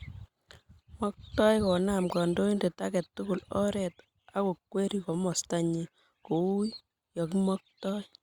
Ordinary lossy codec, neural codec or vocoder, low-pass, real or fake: Opus, 64 kbps; none; 19.8 kHz; real